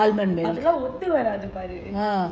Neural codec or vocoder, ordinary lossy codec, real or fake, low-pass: codec, 16 kHz, 16 kbps, FreqCodec, smaller model; none; fake; none